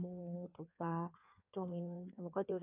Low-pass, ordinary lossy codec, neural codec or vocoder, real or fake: 3.6 kHz; AAC, 24 kbps; codec, 16 kHz in and 24 kHz out, 1.1 kbps, FireRedTTS-2 codec; fake